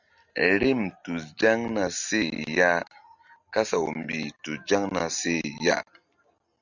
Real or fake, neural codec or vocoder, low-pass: real; none; 7.2 kHz